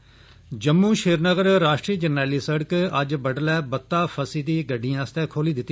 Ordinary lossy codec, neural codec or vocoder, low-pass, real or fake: none; none; none; real